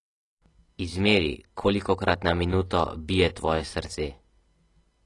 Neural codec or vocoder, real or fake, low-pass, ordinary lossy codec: none; real; 10.8 kHz; AAC, 32 kbps